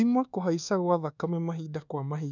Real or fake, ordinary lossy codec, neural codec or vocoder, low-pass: fake; none; codec, 24 kHz, 1.2 kbps, DualCodec; 7.2 kHz